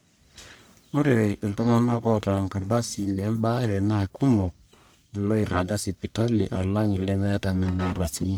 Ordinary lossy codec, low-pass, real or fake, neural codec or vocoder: none; none; fake; codec, 44.1 kHz, 1.7 kbps, Pupu-Codec